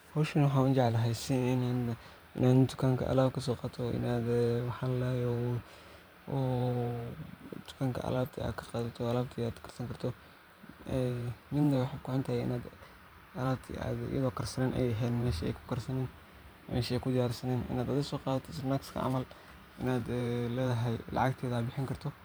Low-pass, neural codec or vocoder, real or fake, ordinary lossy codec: none; vocoder, 44.1 kHz, 128 mel bands every 512 samples, BigVGAN v2; fake; none